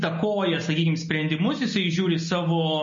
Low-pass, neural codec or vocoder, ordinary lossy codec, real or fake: 7.2 kHz; none; MP3, 32 kbps; real